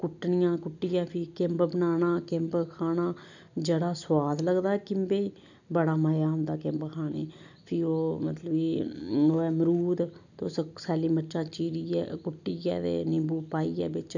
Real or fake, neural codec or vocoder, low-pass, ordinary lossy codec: real; none; 7.2 kHz; none